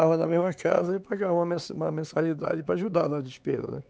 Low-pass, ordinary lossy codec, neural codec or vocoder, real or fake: none; none; codec, 16 kHz, 4 kbps, X-Codec, HuBERT features, trained on LibriSpeech; fake